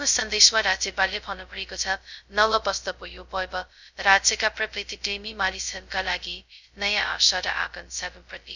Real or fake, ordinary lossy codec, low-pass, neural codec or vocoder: fake; none; 7.2 kHz; codec, 16 kHz, 0.2 kbps, FocalCodec